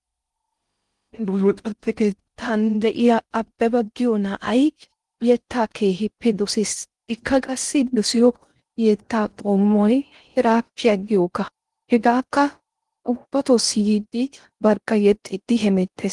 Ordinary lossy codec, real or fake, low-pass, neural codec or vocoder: Opus, 24 kbps; fake; 10.8 kHz; codec, 16 kHz in and 24 kHz out, 0.6 kbps, FocalCodec, streaming, 4096 codes